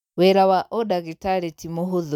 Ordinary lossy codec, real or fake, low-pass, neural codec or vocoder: none; real; 19.8 kHz; none